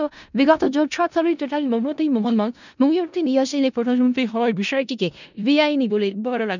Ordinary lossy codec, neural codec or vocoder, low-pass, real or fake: none; codec, 16 kHz in and 24 kHz out, 0.4 kbps, LongCat-Audio-Codec, four codebook decoder; 7.2 kHz; fake